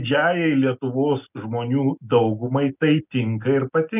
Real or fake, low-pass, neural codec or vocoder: real; 3.6 kHz; none